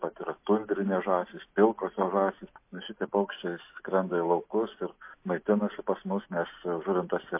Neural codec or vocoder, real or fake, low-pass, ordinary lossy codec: none; real; 3.6 kHz; MP3, 24 kbps